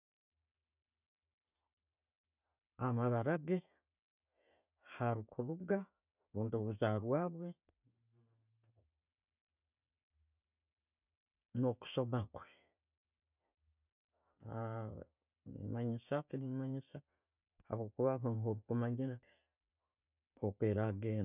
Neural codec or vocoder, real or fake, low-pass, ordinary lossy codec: none; real; 3.6 kHz; none